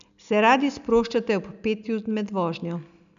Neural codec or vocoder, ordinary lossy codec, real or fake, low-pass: none; none; real; 7.2 kHz